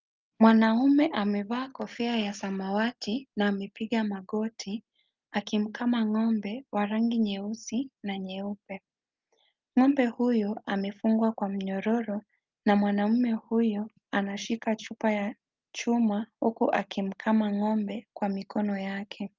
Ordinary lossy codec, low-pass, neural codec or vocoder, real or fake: Opus, 24 kbps; 7.2 kHz; none; real